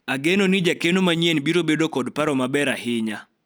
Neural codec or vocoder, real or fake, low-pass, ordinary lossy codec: none; real; none; none